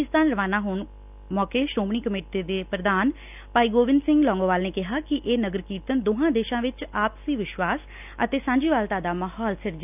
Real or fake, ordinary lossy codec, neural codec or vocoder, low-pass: real; none; none; 3.6 kHz